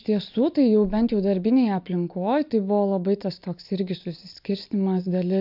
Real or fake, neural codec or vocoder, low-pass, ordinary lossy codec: real; none; 5.4 kHz; AAC, 48 kbps